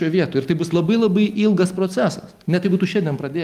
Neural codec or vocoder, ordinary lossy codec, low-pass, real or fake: none; Opus, 32 kbps; 14.4 kHz; real